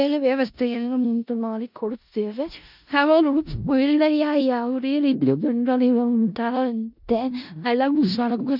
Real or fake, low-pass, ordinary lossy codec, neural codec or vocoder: fake; 5.4 kHz; none; codec, 16 kHz in and 24 kHz out, 0.4 kbps, LongCat-Audio-Codec, four codebook decoder